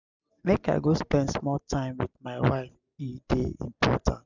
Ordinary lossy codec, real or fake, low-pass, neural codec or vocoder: none; real; 7.2 kHz; none